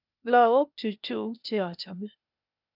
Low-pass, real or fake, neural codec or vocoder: 5.4 kHz; fake; codec, 16 kHz, 0.8 kbps, ZipCodec